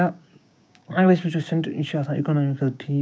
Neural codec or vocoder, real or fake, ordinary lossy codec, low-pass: codec, 16 kHz, 6 kbps, DAC; fake; none; none